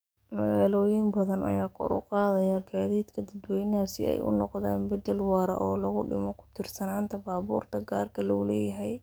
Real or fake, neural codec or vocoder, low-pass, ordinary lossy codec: fake; codec, 44.1 kHz, 7.8 kbps, DAC; none; none